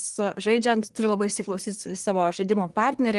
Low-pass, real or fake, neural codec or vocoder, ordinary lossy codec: 10.8 kHz; fake; codec, 24 kHz, 1 kbps, SNAC; Opus, 24 kbps